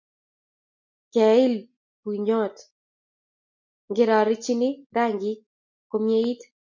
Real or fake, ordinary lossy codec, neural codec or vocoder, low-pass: real; MP3, 48 kbps; none; 7.2 kHz